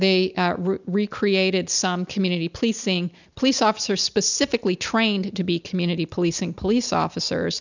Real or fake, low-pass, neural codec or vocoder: real; 7.2 kHz; none